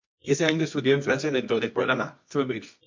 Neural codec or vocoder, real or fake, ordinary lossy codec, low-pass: codec, 24 kHz, 0.9 kbps, WavTokenizer, medium music audio release; fake; MP3, 48 kbps; 7.2 kHz